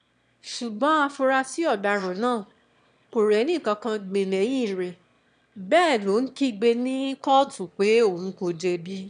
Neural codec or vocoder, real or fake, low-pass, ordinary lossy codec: autoencoder, 22.05 kHz, a latent of 192 numbers a frame, VITS, trained on one speaker; fake; 9.9 kHz; none